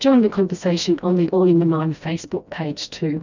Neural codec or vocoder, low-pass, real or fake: codec, 16 kHz, 1 kbps, FreqCodec, smaller model; 7.2 kHz; fake